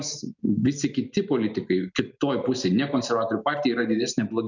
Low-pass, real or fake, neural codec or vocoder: 7.2 kHz; real; none